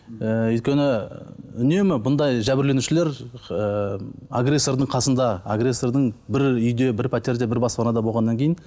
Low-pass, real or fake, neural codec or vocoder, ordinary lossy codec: none; real; none; none